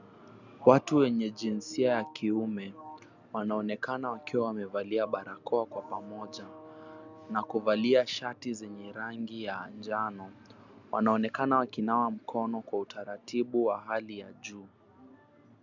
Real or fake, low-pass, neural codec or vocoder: real; 7.2 kHz; none